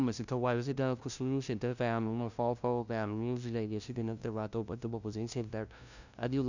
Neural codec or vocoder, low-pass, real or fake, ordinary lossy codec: codec, 16 kHz, 0.5 kbps, FunCodec, trained on LibriTTS, 25 frames a second; 7.2 kHz; fake; none